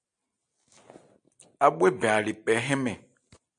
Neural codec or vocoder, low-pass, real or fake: none; 9.9 kHz; real